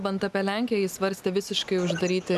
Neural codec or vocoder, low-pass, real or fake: none; 14.4 kHz; real